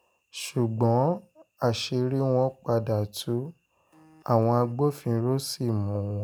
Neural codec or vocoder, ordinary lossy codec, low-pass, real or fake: none; none; none; real